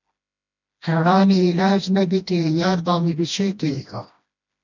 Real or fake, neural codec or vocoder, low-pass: fake; codec, 16 kHz, 1 kbps, FreqCodec, smaller model; 7.2 kHz